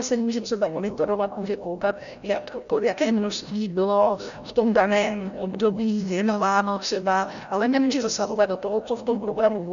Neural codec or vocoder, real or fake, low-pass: codec, 16 kHz, 0.5 kbps, FreqCodec, larger model; fake; 7.2 kHz